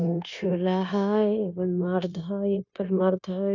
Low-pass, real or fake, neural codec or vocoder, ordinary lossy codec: 7.2 kHz; fake; codec, 16 kHz, 0.9 kbps, LongCat-Audio-Codec; none